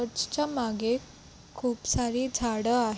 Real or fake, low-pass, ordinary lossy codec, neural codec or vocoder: real; none; none; none